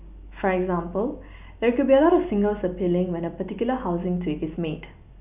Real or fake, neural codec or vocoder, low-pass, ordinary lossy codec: real; none; 3.6 kHz; none